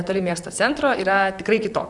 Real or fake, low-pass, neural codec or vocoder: fake; 10.8 kHz; vocoder, 44.1 kHz, 128 mel bands, Pupu-Vocoder